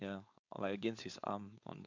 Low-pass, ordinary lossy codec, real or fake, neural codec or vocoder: 7.2 kHz; MP3, 64 kbps; fake; codec, 16 kHz, 4.8 kbps, FACodec